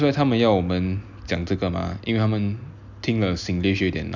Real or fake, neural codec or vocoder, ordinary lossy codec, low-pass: real; none; none; 7.2 kHz